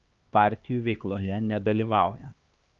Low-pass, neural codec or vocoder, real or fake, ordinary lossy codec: 7.2 kHz; codec, 16 kHz, 2 kbps, X-Codec, HuBERT features, trained on LibriSpeech; fake; Opus, 32 kbps